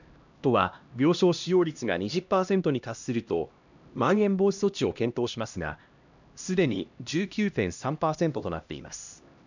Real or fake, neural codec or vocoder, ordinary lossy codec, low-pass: fake; codec, 16 kHz, 1 kbps, X-Codec, HuBERT features, trained on LibriSpeech; none; 7.2 kHz